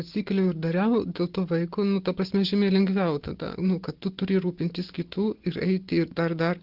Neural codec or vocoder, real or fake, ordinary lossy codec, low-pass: none; real; Opus, 16 kbps; 5.4 kHz